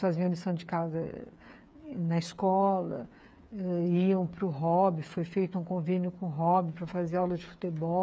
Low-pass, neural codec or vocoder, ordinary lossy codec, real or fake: none; codec, 16 kHz, 8 kbps, FreqCodec, smaller model; none; fake